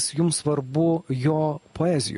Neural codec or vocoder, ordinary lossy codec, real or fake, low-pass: none; MP3, 48 kbps; real; 10.8 kHz